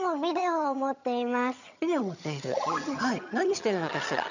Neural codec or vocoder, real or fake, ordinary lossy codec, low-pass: vocoder, 22.05 kHz, 80 mel bands, HiFi-GAN; fake; none; 7.2 kHz